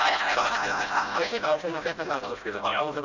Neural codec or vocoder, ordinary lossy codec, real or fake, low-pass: codec, 16 kHz, 0.5 kbps, FreqCodec, smaller model; none; fake; 7.2 kHz